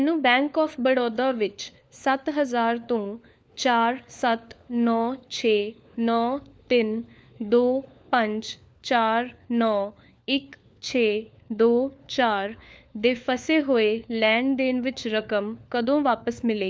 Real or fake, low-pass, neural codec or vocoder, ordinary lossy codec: fake; none; codec, 16 kHz, 4 kbps, FunCodec, trained on LibriTTS, 50 frames a second; none